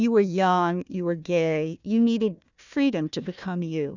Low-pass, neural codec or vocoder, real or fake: 7.2 kHz; codec, 16 kHz, 1 kbps, FunCodec, trained on Chinese and English, 50 frames a second; fake